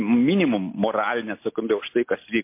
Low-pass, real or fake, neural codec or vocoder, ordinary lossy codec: 3.6 kHz; real; none; MP3, 24 kbps